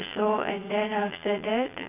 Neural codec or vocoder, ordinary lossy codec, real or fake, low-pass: vocoder, 22.05 kHz, 80 mel bands, Vocos; none; fake; 3.6 kHz